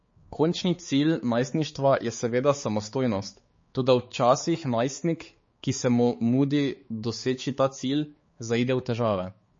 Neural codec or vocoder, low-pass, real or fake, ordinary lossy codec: codec, 16 kHz, 4 kbps, X-Codec, HuBERT features, trained on balanced general audio; 7.2 kHz; fake; MP3, 32 kbps